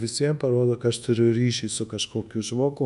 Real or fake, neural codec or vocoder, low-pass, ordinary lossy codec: fake; codec, 24 kHz, 1.2 kbps, DualCodec; 10.8 kHz; MP3, 96 kbps